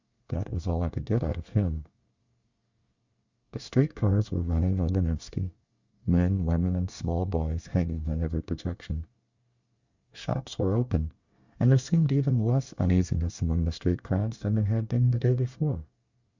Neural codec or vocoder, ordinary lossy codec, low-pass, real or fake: codec, 24 kHz, 1 kbps, SNAC; Opus, 64 kbps; 7.2 kHz; fake